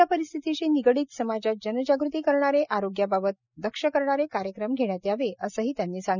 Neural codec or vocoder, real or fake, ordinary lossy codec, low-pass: none; real; none; 7.2 kHz